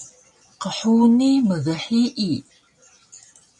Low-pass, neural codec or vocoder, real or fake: 10.8 kHz; none; real